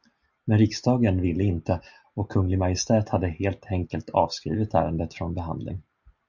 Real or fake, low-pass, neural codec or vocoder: real; 7.2 kHz; none